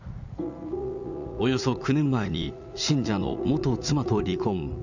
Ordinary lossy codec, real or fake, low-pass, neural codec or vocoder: none; fake; 7.2 kHz; vocoder, 44.1 kHz, 80 mel bands, Vocos